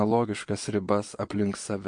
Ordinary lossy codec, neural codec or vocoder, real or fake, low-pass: MP3, 48 kbps; vocoder, 22.05 kHz, 80 mel bands, Vocos; fake; 9.9 kHz